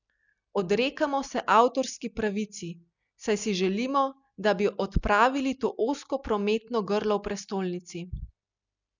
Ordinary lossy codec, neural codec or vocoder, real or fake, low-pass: none; none; real; 7.2 kHz